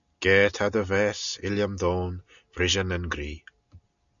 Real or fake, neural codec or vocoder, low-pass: real; none; 7.2 kHz